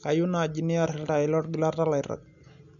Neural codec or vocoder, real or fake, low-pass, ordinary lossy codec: none; real; 7.2 kHz; none